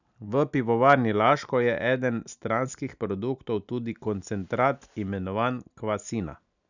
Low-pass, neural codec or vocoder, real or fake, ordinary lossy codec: 7.2 kHz; none; real; none